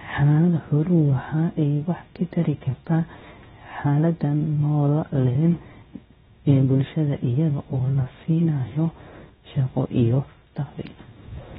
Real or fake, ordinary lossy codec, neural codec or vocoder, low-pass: fake; AAC, 16 kbps; codec, 16 kHz, 0.7 kbps, FocalCodec; 7.2 kHz